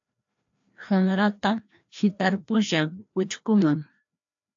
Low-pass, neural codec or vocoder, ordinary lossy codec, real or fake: 7.2 kHz; codec, 16 kHz, 1 kbps, FreqCodec, larger model; AAC, 64 kbps; fake